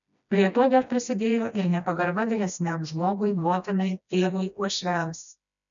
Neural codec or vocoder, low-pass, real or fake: codec, 16 kHz, 1 kbps, FreqCodec, smaller model; 7.2 kHz; fake